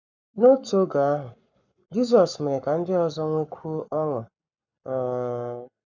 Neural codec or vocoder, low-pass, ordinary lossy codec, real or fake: codec, 44.1 kHz, 7.8 kbps, Pupu-Codec; 7.2 kHz; none; fake